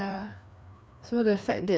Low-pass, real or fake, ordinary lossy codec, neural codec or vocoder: none; fake; none; codec, 16 kHz, 2 kbps, FreqCodec, larger model